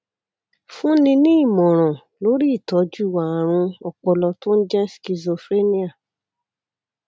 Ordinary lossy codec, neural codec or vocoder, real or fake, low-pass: none; none; real; none